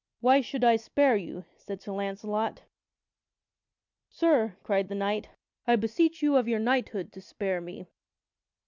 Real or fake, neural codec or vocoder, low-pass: real; none; 7.2 kHz